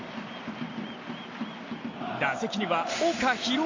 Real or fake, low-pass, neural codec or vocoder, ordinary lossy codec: real; 7.2 kHz; none; MP3, 48 kbps